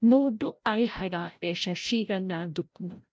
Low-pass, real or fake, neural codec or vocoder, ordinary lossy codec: none; fake; codec, 16 kHz, 0.5 kbps, FreqCodec, larger model; none